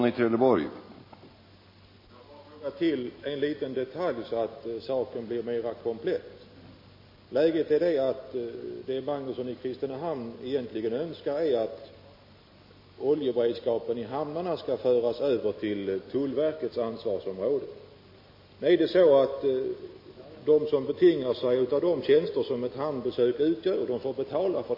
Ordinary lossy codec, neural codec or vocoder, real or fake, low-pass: MP3, 24 kbps; none; real; 5.4 kHz